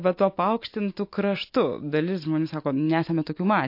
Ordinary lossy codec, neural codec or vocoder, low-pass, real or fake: MP3, 32 kbps; none; 5.4 kHz; real